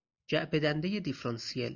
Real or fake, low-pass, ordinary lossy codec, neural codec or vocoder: real; 7.2 kHz; Opus, 64 kbps; none